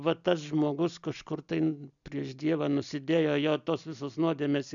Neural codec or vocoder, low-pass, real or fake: none; 7.2 kHz; real